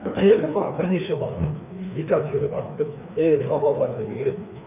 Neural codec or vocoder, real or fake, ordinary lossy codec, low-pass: codec, 16 kHz, 2 kbps, FreqCodec, larger model; fake; none; 3.6 kHz